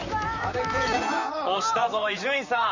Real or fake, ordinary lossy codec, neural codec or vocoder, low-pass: fake; none; vocoder, 44.1 kHz, 128 mel bands, Pupu-Vocoder; 7.2 kHz